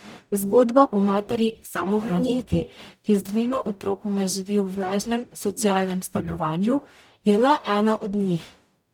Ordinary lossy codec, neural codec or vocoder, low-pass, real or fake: none; codec, 44.1 kHz, 0.9 kbps, DAC; 19.8 kHz; fake